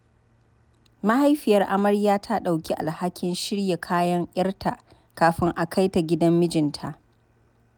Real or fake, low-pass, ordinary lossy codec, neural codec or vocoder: real; none; none; none